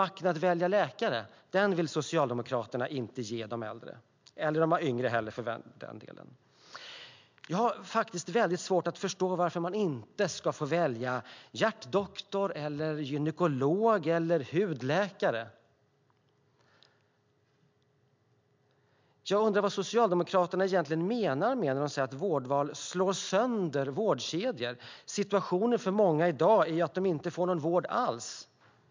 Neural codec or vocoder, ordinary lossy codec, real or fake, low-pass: none; MP3, 64 kbps; real; 7.2 kHz